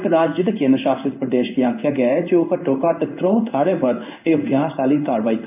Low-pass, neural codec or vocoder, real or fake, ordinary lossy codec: 3.6 kHz; codec, 16 kHz in and 24 kHz out, 1 kbps, XY-Tokenizer; fake; AAC, 32 kbps